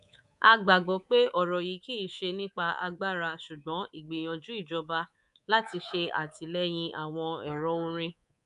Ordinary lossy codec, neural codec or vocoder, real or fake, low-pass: none; codec, 24 kHz, 3.1 kbps, DualCodec; fake; 10.8 kHz